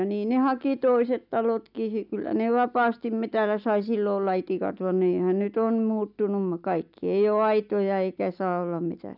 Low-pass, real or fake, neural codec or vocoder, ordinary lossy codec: 5.4 kHz; real; none; none